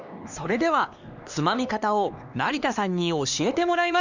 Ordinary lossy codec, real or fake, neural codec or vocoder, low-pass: Opus, 64 kbps; fake; codec, 16 kHz, 2 kbps, X-Codec, HuBERT features, trained on LibriSpeech; 7.2 kHz